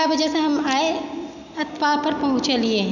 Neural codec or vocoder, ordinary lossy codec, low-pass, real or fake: none; none; 7.2 kHz; real